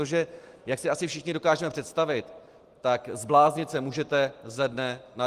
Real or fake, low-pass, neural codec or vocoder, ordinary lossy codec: real; 14.4 kHz; none; Opus, 24 kbps